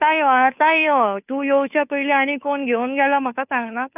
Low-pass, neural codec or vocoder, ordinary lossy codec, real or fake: 3.6 kHz; codec, 16 kHz, 2 kbps, FunCodec, trained on Chinese and English, 25 frames a second; none; fake